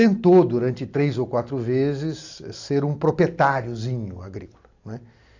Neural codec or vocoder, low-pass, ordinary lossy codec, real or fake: none; 7.2 kHz; AAC, 48 kbps; real